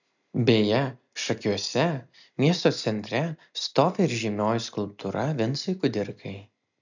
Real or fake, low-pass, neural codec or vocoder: real; 7.2 kHz; none